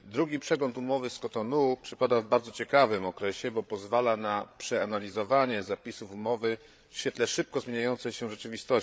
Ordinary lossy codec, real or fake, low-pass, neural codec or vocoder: none; fake; none; codec, 16 kHz, 8 kbps, FreqCodec, larger model